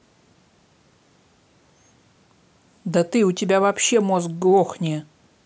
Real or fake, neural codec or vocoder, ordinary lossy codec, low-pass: real; none; none; none